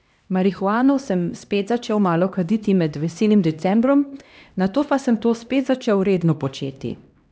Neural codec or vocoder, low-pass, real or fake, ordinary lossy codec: codec, 16 kHz, 1 kbps, X-Codec, HuBERT features, trained on LibriSpeech; none; fake; none